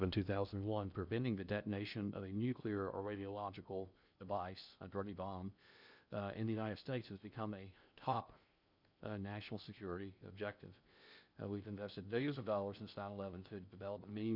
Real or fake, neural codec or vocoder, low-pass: fake; codec, 16 kHz in and 24 kHz out, 0.6 kbps, FocalCodec, streaming, 2048 codes; 5.4 kHz